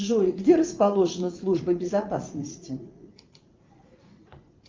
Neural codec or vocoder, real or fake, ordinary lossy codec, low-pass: vocoder, 44.1 kHz, 80 mel bands, Vocos; fake; Opus, 32 kbps; 7.2 kHz